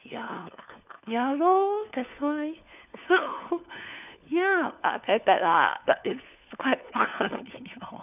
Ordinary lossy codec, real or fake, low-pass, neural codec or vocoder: none; fake; 3.6 kHz; codec, 16 kHz, 4 kbps, FunCodec, trained on LibriTTS, 50 frames a second